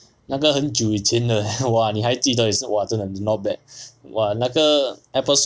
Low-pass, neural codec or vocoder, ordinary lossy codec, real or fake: none; none; none; real